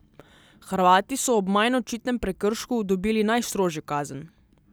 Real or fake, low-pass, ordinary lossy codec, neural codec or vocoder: real; none; none; none